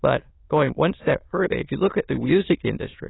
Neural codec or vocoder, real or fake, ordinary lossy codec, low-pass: autoencoder, 22.05 kHz, a latent of 192 numbers a frame, VITS, trained on many speakers; fake; AAC, 16 kbps; 7.2 kHz